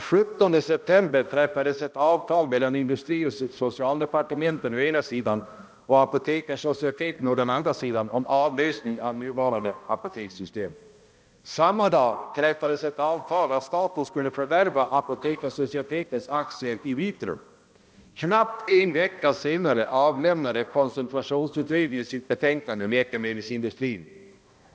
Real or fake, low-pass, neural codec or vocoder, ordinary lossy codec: fake; none; codec, 16 kHz, 1 kbps, X-Codec, HuBERT features, trained on balanced general audio; none